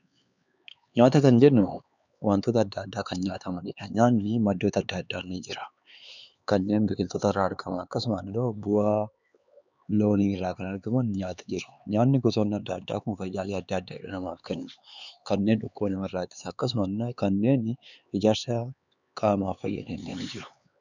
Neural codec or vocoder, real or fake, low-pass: codec, 16 kHz, 4 kbps, X-Codec, HuBERT features, trained on LibriSpeech; fake; 7.2 kHz